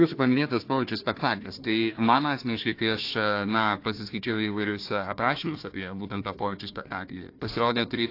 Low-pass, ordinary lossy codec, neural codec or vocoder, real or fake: 5.4 kHz; AAC, 32 kbps; codec, 16 kHz, 1 kbps, FunCodec, trained on Chinese and English, 50 frames a second; fake